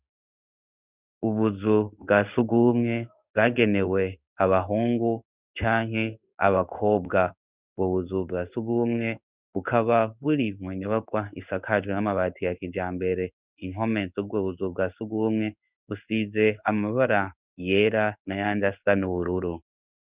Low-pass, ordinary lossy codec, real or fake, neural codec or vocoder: 3.6 kHz; Opus, 64 kbps; fake; codec, 16 kHz in and 24 kHz out, 1 kbps, XY-Tokenizer